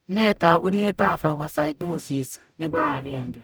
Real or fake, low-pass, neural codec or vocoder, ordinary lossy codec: fake; none; codec, 44.1 kHz, 0.9 kbps, DAC; none